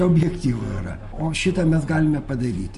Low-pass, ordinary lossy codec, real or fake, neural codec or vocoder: 14.4 kHz; MP3, 48 kbps; real; none